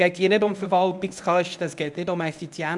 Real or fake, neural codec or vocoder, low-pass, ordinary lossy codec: fake; codec, 24 kHz, 0.9 kbps, WavTokenizer, medium speech release version 1; 10.8 kHz; none